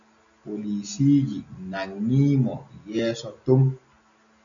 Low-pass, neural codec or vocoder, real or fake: 7.2 kHz; none; real